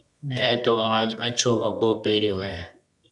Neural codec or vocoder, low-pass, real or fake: codec, 24 kHz, 0.9 kbps, WavTokenizer, medium music audio release; 10.8 kHz; fake